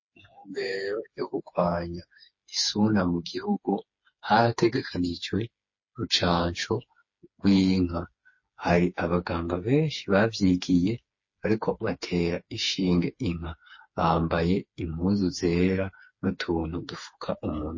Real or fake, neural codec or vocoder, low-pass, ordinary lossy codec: fake; codec, 16 kHz, 4 kbps, FreqCodec, smaller model; 7.2 kHz; MP3, 32 kbps